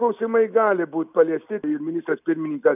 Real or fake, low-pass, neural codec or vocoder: real; 3.6 kHz; none